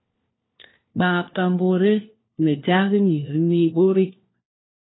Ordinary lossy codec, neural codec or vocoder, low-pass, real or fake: AAC, 16 kbps; codec, 16 kHz, 1 kbps, FunCodec, trained on LibriTTS, 50 frames a second; 7.2 kHz; fake